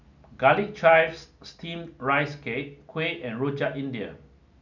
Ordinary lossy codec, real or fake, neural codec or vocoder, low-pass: none; real; none; 7.2 kHz